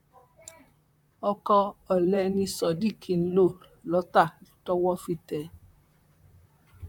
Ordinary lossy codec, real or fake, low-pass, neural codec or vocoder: none; fake; 19.8 kHz; vocoder, 44.1 kHz, 128 mel bands, Pupu-Vocoder